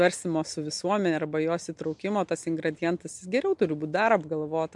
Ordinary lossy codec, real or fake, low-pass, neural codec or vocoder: MP3, 64 kbps; real; 10.8 kHz; none